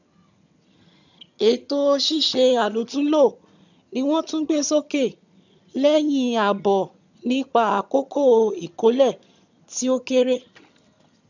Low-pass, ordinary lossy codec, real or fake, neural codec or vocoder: 7.2 kHz; none; fake; vocoder, 22.05 kHz, 80 mel bands, HiFi-GAN